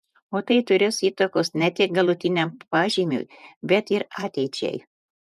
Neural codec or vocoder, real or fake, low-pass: vocoder, 48 kHz, 128 mel bands, Vocos; fake; 14.4 kHz